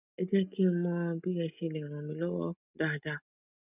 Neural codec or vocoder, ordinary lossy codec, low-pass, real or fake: none; none; 3.6 kHz; real